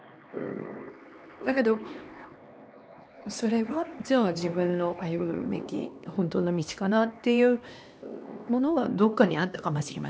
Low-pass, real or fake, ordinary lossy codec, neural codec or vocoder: none; fake; none; codec, 16 kHz, 2 kbps, X-Codec, HuBERT features, trained on LibriSpeech